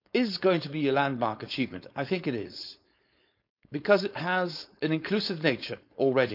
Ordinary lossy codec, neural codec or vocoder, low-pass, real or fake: none; codec, 16 kHz, 4.8 kbps, FACodec; 5.4 kHz; fake